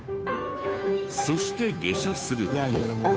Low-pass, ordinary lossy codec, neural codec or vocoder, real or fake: none; none; codec, 16 kHz, 2 kbps, FunCodec, trained on Chinese and English, 25 frames a second; fake